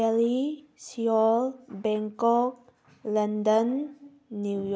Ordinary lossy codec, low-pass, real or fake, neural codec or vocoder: none; none; real; none